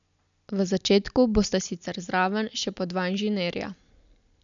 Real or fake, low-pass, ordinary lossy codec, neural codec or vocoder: real; 7.2 kHz; none; none